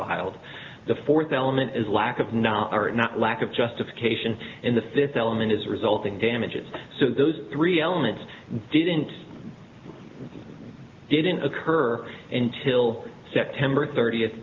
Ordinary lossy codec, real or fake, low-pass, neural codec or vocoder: Opus, 24 kbps; real; 7.2 kHz; none